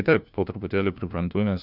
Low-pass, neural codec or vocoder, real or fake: 5.4 kHz; codec, 16 kHz, 1 kbps, FunCodec, trained on LibriTTS, 50 frames a second; fake